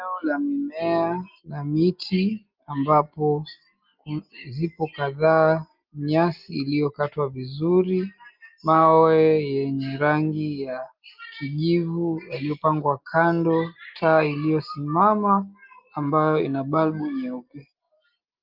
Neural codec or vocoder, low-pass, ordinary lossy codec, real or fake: none; 5.4 kHz; Opus, 32 kbps; real